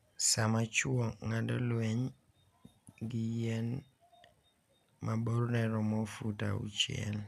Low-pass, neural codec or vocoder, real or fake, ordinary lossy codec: 14.4 kHz; none; real; none